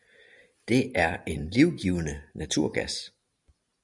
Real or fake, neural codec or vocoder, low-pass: real; none; 10.8 kHz